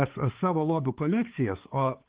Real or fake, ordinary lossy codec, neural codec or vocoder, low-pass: fake; Opus, 16 kbps; codec, 16 kHz, 4 kbps, X-Codec, HuBERT features, trained on balanced general audio; 3.6 kHz